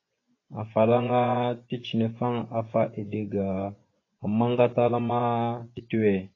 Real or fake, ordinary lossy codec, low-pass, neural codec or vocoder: fake; AAC, 32 kbps; 7.2 kHz; vocoder, 24 kHz, 100 mel bands, Vocos